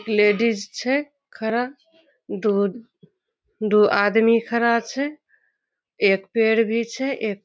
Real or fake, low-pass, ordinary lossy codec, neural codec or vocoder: real; none; none; none